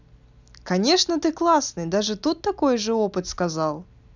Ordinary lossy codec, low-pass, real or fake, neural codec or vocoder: none; 7.2 kHz; real; none